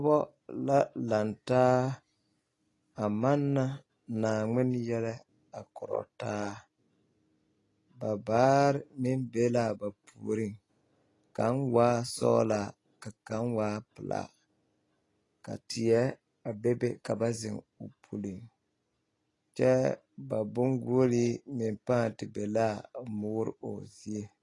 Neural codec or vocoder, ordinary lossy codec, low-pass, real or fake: none; AAC, 32 kbps; 10.8 kHz; real